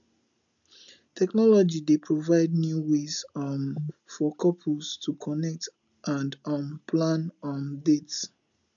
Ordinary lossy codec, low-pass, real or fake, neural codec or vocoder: MP3, 96 kbps; 7.2 kHz; real; none